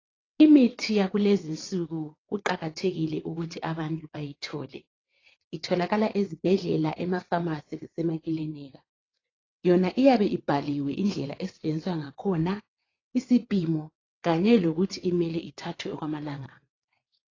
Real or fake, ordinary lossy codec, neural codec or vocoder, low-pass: fake; AAC, 32 kbps; vocoder, 22.05 kHz, 80 mel bands, WaveNeXt; 7.2 kHz